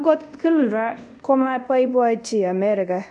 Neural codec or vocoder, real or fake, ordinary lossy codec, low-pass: codec, 24 kHz, 0.5 kbps, DualCodec; fake; none; 10.8 kHz